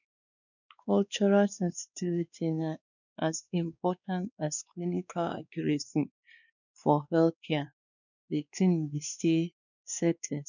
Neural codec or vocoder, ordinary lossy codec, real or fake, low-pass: codec, 16 kHz, 2 kbps, X-Codec, WavLM features, trained on Multilingual LibriSpeech; none; fake; 7.2 kHz